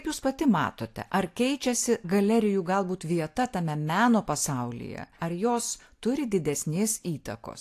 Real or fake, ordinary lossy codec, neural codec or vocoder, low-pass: real; AAC, 64 kbps; none; 14.4 kHz